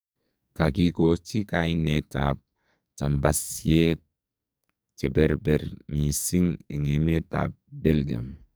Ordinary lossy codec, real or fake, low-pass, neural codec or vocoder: none; fake; none; codec, 44.1 kHz, 2.6 kbps, SNAC